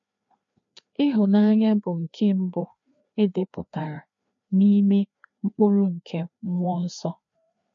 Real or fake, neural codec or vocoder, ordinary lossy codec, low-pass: fake; codec, 16 kHz, 2 kbps, FreqCodec, larger model; MP3, 48 kbps; 7.2 kHz